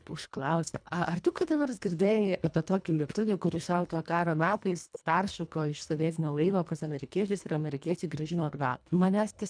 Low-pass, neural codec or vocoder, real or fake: 9.9 kHz; codec, 24 kHz, 1.5 kbps, HILCodec; fake